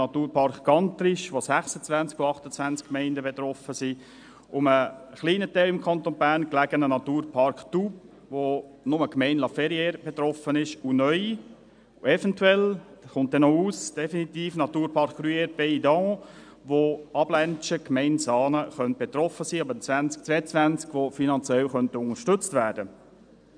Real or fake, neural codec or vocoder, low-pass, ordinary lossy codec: real; none; 9.9 kHz; none